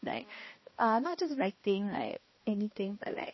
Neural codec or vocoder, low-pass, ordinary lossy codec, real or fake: codec, 16 kHz, 1 kbps, X-Codec, HuBERT features, trained on balanced general audio; 7.2 kHz; MP3, 24 kbps; fake